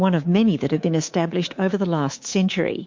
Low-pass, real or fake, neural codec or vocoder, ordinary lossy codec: 7.2 kHz; fake; codec, 24 kHz, 3.1 kbps, DualCodec; MP3, 48 kbps